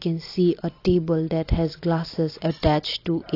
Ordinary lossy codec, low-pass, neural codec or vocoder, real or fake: AAC, 32 kbps; 5.4 kHz; none; real